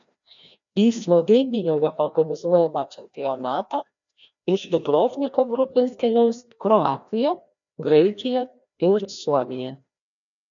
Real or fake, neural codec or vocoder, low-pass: fake; codec, 16 kHz, 1 kbps, FreqCodec, larger model; 7.2 kHz